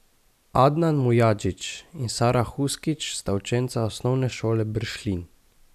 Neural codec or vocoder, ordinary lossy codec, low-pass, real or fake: none; none; 14.4 kHz; real